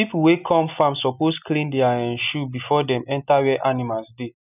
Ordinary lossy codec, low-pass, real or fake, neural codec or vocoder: none; 3.6 kHz; real; none